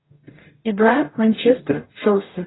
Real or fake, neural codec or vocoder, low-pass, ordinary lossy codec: fake; codec, 44.1 kHz, 0.9 kbps, DAC; 7.2 kHz; AAC, 16 kbps